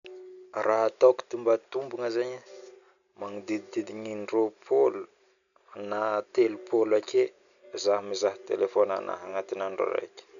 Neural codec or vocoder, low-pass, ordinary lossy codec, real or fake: none; 7.2 kHz; none; real